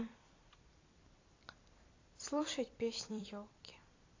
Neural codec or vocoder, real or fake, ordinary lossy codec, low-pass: vocoder, 44.1 kHz, 128 mel bands every 256 samples, BigVGAN v2; fake; AAC, 32 kbps; 7.2 kHz